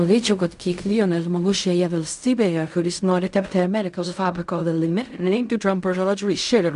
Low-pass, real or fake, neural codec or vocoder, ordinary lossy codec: 10.8 kHz; fake; codec, 16 kHz in and 24 kHz out, 0.4 kbps, LongCat-Audio-Codec, fine tuned four codebook decoder; AAC, 96 kbps